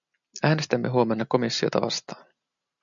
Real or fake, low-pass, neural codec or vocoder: real; 7.2 kHz; none